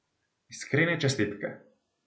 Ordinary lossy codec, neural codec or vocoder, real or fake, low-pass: none; none; real; none